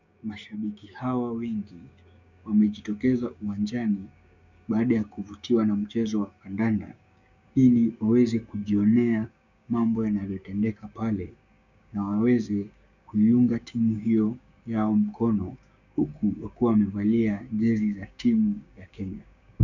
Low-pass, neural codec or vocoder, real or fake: 7.2 kHz; codec, 16 kHz, 6 kbps, DAC; fake